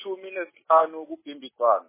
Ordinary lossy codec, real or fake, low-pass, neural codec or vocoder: MP3, 16 kbps; real; 3.6 kHz; none